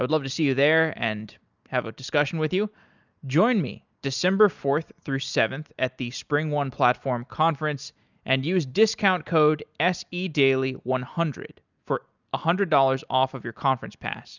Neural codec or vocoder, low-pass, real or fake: none; 7.2 kHz; real